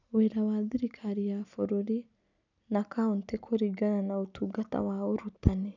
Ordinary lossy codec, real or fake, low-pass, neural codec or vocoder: none; real; 7.2 kHz; none